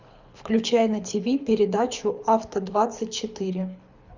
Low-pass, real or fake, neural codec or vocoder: 7.2 kHz; fake; codec, 24 kHz, 6 kbps, HILCodec